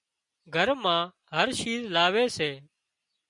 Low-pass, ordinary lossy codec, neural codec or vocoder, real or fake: 10.8 kHz; MP3, 96 kbps; none; real